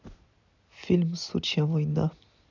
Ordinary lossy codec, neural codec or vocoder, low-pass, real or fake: none; none; 7.2 kHz; real